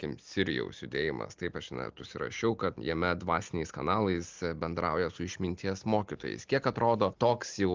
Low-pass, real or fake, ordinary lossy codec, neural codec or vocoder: 7.2 kHz; real; Opus, 32 kbps; none